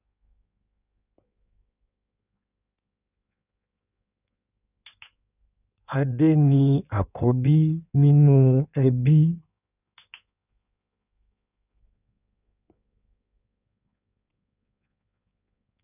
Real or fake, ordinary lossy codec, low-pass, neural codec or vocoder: fake; none; 3.6 kHz; codec, 16 kHz in and 24 kHz out, 1.1 kbps, FireRedTTS-2 codec